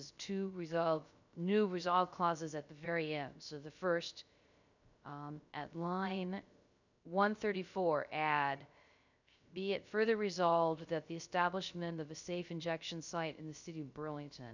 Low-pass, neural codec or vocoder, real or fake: 7.2 kHz; codec, 16 kHz, 0.3 kbps, FocalCodec; fake